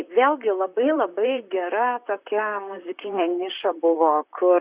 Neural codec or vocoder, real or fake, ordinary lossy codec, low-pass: vocoder, 44.1 kHz, 128 mel bands, Pupu-Vocoder; fake; Opus, 64 kbps; 3.6 kHz